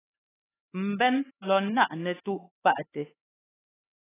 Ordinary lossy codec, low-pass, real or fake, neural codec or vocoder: AAC, 16 kbps; 3.6 kHz; fake; codec, 16 kHz, 4 kbps, X-Codec, HuBERT features, trained on LibriSpeech